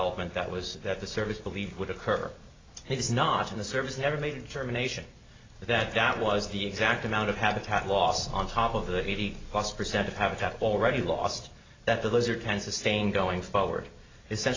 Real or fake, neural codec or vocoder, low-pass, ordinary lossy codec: real; none; 7.2 kHz; AAC, 32 kbps